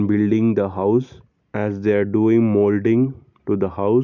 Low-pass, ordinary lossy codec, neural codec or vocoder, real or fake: 7.2 kHz; none; none; real